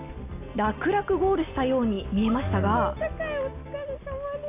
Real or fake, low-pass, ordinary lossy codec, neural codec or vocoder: real; 3.6 kHz; AAC, 24 kbps; none